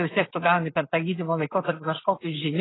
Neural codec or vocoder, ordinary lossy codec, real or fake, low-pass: none; AAC, 16 kbps; real; 7.2 kHz